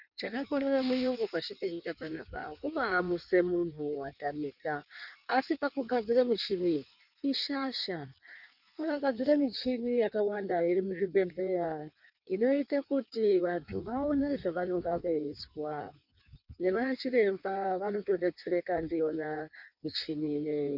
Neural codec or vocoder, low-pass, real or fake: codec, 16 kHz in and 24 kHz out, 1.1 kbps, FireRedTTS-2 codec; 5.4 kHz; fake